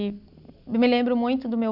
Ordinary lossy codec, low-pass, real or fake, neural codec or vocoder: none; 5.4 kHz; real; none